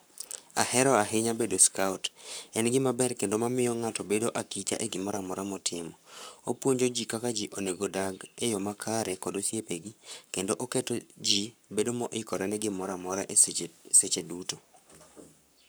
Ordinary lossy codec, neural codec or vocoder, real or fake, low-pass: none; codec, 44.1 kHz, 7.8 kbps, Pupu-Codec; fake; none